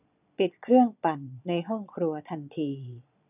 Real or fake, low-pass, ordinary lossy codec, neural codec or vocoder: fake; 3.6 kHz; none; vocoder, 22.05 kHz, 80 mel bands, Vocos